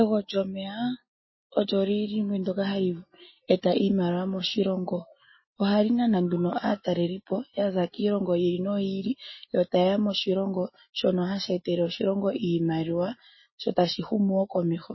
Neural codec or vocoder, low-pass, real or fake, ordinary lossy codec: none; 7.2 kHz; real; MP3, 24 kbps